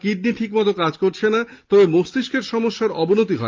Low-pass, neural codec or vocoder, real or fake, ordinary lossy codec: 7.2 kHz; none; real; Opus, 24 kbps